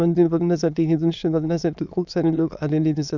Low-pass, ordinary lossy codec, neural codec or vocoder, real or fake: 7.2 kHz; none; autoencoder, 22.05 kHz, a latent of 192 numbers a frame, VITS, trained on many speakers; fake